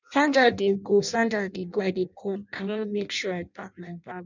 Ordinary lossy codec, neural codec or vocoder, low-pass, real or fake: none; codec, 16 kHz in and 24 kHz out, 0.6 kbps, FireRedTTS-2 codec; 7.2 kHz; fake